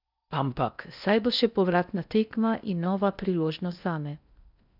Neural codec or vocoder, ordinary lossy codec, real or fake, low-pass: codec, 16 kHz in and 24 kHz out, 0.6 kbps, FocalCodec, streaming, 4096 codes; none; fake; 5.4 kHz